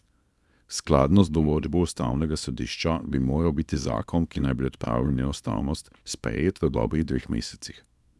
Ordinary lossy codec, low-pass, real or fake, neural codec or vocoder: none; none; fake; codec, 24 kHz, 0.9 kbps, WavTokenizer, small release